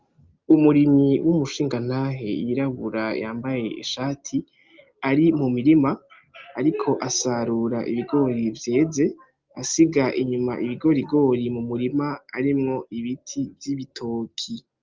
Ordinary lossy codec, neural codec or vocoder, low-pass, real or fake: Opus, 24 kbps; none; 7.2 kHz; real